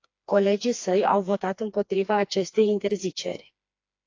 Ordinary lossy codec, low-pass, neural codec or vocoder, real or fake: AAC, 48 kbps; 7.2 kHz; codec, 16 kHz, 2 kbps, FreqCodec, smaller model; fake